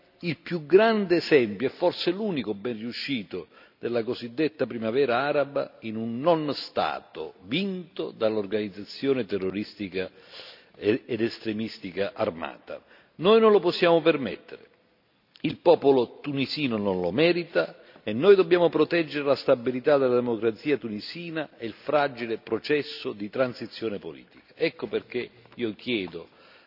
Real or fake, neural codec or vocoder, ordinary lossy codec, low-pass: real; none; none; 5.4 kHz